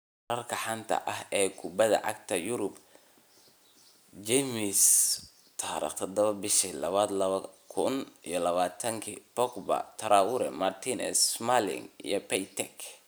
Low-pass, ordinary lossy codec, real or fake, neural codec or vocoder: none; none; real; none